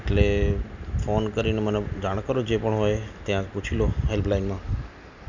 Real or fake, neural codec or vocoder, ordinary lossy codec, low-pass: real; none; none; 7.2 kHz